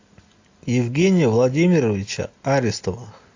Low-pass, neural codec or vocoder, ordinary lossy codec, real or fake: 7.2 kHz; none; AAC, 48 kbps; real